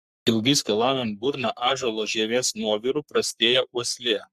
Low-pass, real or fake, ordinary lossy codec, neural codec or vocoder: 14.4 kHz; fake; Opus, 64 kbps; codec, 44.1 kHz, 3.4 kbps, Pupu-Codec